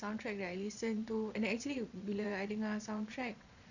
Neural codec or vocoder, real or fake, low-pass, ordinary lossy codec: vocoder, 22.05 kHz, 80 mel bands, Vocos; fake; 7.2 kHz; none